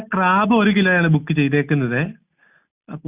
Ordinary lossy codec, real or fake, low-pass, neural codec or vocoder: Opus, 64 kbps; real; 3.6 kHz; none